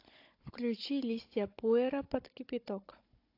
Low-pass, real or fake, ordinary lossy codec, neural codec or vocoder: 5.4 kHz; fake; AAC, 32 kbps; codec, 16 kHz, 16 kbps, FunCodec, trained on Chinese and English, 50 frames a second